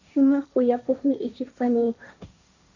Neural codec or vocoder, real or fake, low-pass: codec, 16 kHz, 1.1 kbps, Voila-Tokenizer; fake; 7.2 kHz